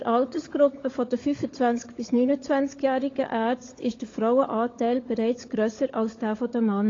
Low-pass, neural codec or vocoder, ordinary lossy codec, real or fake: 7.2 kHz; codec, 16 kHz, 16 kbps, FunCodec, trained on LibriTTS, 50 frames a second; AAC, 32 kbps; fake